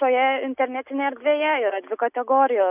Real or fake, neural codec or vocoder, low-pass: real; none; 3.6 kHz